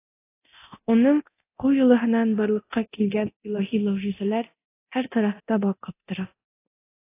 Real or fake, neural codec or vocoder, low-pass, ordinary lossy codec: fake; codec, 24 kHz, 0.9 kbps, DualCodec; 3.6 kHz; AAC, 24 kbps